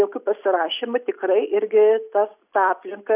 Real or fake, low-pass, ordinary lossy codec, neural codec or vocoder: real; 3.6 kHz; AAC, 32 kbps; none